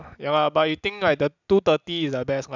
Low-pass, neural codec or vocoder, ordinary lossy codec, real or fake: 7.2 kHz; none; none; real